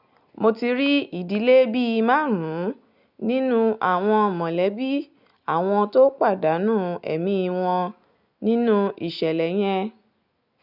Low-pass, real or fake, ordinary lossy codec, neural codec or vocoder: 5.4 kHz; real; none; none